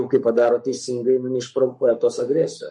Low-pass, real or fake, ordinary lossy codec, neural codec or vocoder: 10.8 kHz; fake; MP3, 48 kbps; codec, 44.1 kHz, 7.8 kbps, Pupu-Codec